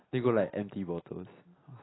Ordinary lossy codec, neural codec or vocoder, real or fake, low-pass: AAC, 16 kbps; none; real; 7.2 kHz